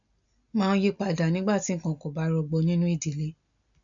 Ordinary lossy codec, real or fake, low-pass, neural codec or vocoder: none; real; 7.2 kHz; none